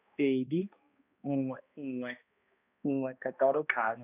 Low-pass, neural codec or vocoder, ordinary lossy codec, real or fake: 3.6 kHz; codec, 16 kHz, 1 kbps, X-Codec, HuBERT features, trained on balanced general audio; none; fake